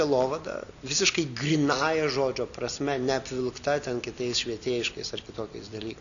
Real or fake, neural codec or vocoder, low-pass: real; none; 7.2 kHz